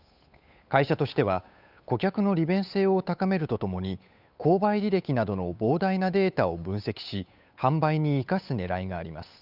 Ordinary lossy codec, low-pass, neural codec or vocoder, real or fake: none; 5.4 kHz; codec, 16 kHz, 8 kbps, FunCodec, trained on Chinese and English, 25 frames a second; fake